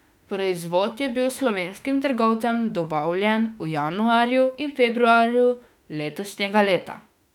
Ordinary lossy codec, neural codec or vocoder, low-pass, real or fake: none; autoencoder, 48 kHz, 32 numbers a frame, DAC-VAE, trained on Japanese speech; 19.8 kHz; fake